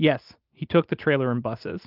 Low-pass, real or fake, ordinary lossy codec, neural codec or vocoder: 5.4 kHz; real; Opus, 32 kbps; none